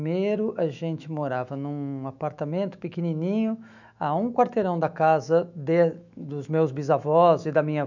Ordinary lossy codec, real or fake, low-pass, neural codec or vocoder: none; fake; 7.2 kHz; autoencoder, 48 kHz, 128 numbers a frame, DAC-VAE, trained on Japanese speech